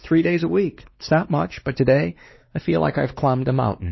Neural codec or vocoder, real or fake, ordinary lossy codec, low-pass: codec, 16 kHz, 2 kbps, X-Codec, WavLM features, trained on Multilingual LibriSpeech; fake; MP3, 24 kbps; 7.2 kHz